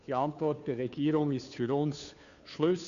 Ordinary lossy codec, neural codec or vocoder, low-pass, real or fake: none; codec, 16 kHz, 2 kbps, FunCodec, trained on Chinese and English, 25 frames a second; 7.2 kHz; fake